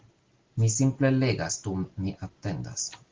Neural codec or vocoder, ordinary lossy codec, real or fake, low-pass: none; Opus, 16 kbps; real; 7.2 kHz